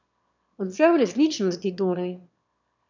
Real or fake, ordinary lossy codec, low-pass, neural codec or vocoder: fake; none; 7.2 kHz; autoencoder, 22.05 kHz, a latent of 192 numbers a frame, VITS, trained on one speaker